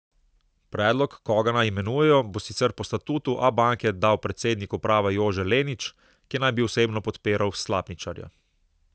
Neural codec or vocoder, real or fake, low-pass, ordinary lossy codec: none; real; none; none